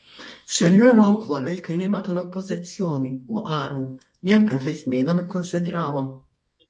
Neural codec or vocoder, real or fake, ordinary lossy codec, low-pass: codec, 24 kHz, 0.9 kbps, WavTokenizer, medium music audio release; fake; MP3, 48 kbps; 10.8 kHz